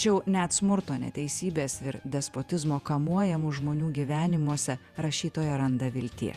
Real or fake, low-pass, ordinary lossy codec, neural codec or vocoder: fake; 14.4 kHz; Opus, 64 kbps; vocoder, 48 kHz, 128 mel bands, Vocos